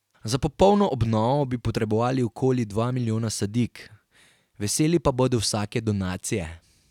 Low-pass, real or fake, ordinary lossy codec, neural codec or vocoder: 19.8 kHz; real; none; none